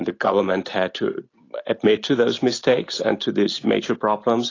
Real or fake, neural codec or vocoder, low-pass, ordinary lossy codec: real; none; 7.2 kHz; AAC, 32 kbps